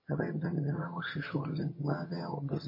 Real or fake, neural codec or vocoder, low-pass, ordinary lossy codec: fake; vocoder, 22.05 kHz, 80 mel bands, HiFi-GAN; 5.4 kHz; MP3, 24 kbps